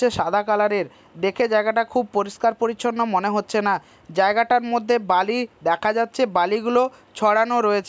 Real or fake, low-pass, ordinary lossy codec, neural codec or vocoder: real; none; none; none